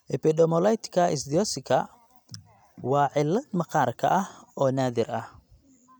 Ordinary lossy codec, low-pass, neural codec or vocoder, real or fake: none; none; none; real